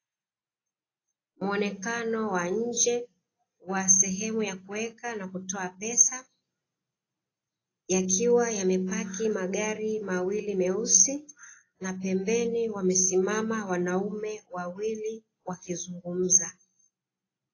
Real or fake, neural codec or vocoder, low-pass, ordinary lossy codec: real; none; 7.2 kHz; AAC, 32 kbps